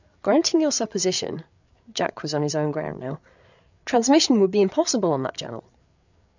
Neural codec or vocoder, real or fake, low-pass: codec, 16 kHz, 8 kbps, FreqCodec, larger model; fake; 7.2 kHz